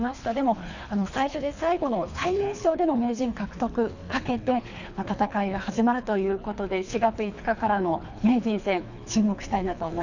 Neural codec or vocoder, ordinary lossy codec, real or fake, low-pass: codec, 24 kHz, 3 kbps, HILCodec; none; fake; 7.2 kHz